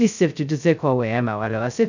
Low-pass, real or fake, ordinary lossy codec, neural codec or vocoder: 7.2 kHz; fake; none; codec, 16 kHz, 0.2 kbps, FocalCodec